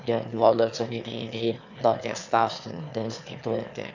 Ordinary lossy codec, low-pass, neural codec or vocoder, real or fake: none; 7.2 kHz; autoencoder, 22.05 kHz, a latent of 192 numbers a frame, VITS, trained on one speaker; fake